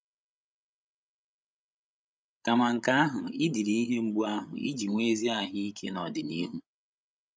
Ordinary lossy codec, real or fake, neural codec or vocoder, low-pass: none; fake; codec, 16 kHz, 16 kbps, FreqCodec, larger model; none